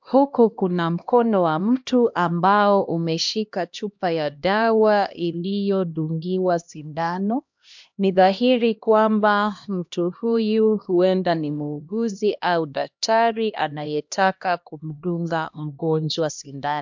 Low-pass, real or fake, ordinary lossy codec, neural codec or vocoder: 7.2 kHz; fake; MP3, 64 kbps; codec, 16 kHz, 1 kbps, X-Codec, HuBERT features, trained on LibriSpeech